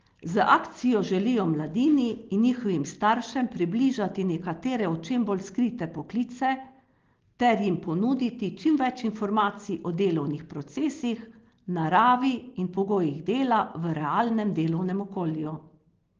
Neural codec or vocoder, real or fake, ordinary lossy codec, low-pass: none; real; Opus, 16 kbps; 7.2 kHz